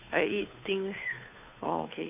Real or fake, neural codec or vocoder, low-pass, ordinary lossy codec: fake; codec, 16 kHz, 2 kbps, FunCodec, trained on Chinese and English, 25 frames a second; 3.6 kHz; none